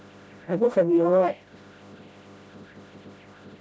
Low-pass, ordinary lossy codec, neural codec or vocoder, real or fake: none; none; codec, 16 kHz, 0.5 kbps, FreqCodec, smaller model; fake